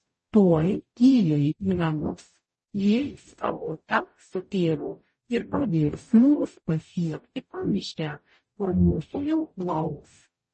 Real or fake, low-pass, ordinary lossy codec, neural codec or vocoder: fake; 10.8 kHz; MP3, 32 kbps; codec, 44.1 kHz, 0.9 kbps, DAC